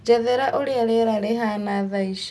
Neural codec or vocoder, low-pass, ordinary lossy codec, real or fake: none; none; none; real